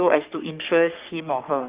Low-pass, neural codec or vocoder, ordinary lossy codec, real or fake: 3.6 kHz; codec, 44.1 kHz, 3.4 kbps, Pupu-Codec; Opus, 24 kbps; fake